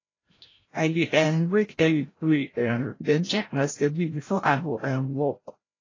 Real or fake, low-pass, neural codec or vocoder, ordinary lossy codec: fake; 7.2 kHz; codec, 16 kHz, 0.5 kbps, FreqCodec, larger model; AAC, 32 kbps